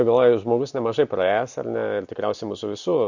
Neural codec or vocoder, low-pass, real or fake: none; 7.2 kHz; real